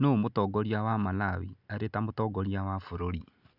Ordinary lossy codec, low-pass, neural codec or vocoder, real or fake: none; 5.4 kHz; none; real